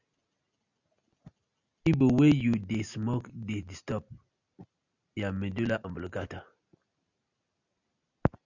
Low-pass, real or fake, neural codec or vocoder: 7.2 kHz; real; none